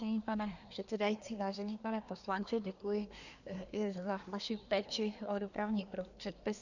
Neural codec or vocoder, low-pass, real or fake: codec, 24 kHz, 1 kbps, SNAC; 7.2 kHz; fake